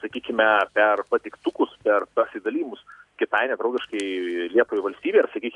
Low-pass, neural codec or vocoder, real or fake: 10.8 kHz; none; real